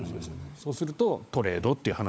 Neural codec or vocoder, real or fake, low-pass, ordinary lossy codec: codec, 16 kHz, 16 kbps, FunCodec, trained on LibriTTS, 50 frames a second; fake; none; none